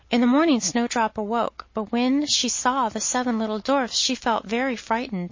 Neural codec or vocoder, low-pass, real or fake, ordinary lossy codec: none; 7.2 kHz; real; MP3, 32 kbps